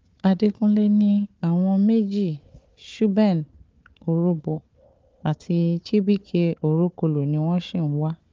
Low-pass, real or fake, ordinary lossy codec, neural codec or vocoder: 7.2 kHz; fake; Opus, 24 kbps; codec, 16 kHz, 4 kbps, FunCodec, trained on Chinese and English, 50 frames a second